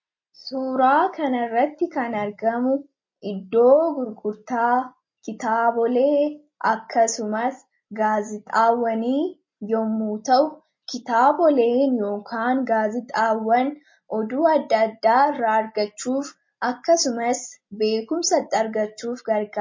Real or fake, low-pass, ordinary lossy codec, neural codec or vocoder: real; 7.2 kHz; MP3, 32 kbps; none